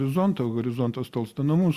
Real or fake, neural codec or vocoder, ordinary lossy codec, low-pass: real; none; Opus, 64 kbps; 14.4 kHz